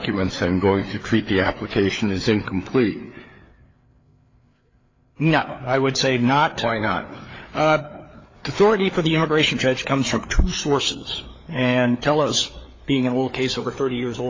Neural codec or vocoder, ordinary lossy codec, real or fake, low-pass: codec, 16 kHz, 4 kbps, FreqCodec, larger model; AAC, 32 kbps; fake; 7.2 kHz